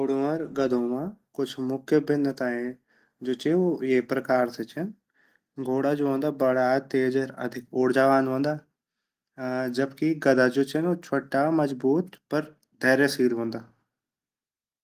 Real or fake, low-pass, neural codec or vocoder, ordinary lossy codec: real; 14.4 kHz; none; Opus, 24 kbps